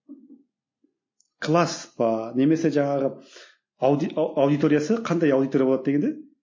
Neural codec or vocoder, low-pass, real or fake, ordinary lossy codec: none; 7.2 kHz; real; MP3, 32 kbps